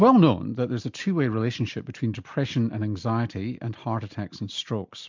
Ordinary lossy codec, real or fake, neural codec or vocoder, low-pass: MP3, 64 kbps; real; none; 7.2 kHz